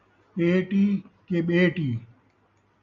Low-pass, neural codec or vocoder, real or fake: 7.2 kHz; none; real